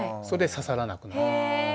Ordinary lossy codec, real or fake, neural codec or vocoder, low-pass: none; real; none; none